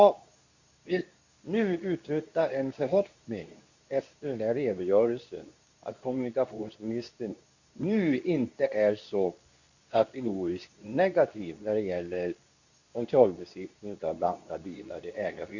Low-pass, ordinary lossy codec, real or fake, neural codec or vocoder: 7.2 kHz; none; fake; codec, 24 kHz, 0.9 kbps, WavTokenizer, medium speech release version 2